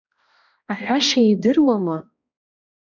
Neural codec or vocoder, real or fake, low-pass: codec, 16 kHz, 1 kbps, X-Codec, HuBERT features, trained on balanced general audio; fake; 7.2 kHz